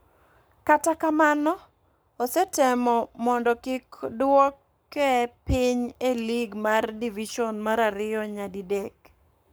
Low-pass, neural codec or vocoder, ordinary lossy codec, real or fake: none; codec, 44.1 kHz, 7.8 kbps, Pupu-Codec; none; fake